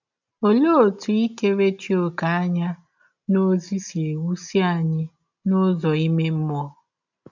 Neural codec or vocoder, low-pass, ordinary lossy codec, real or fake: none; 7.2 kHz; none; real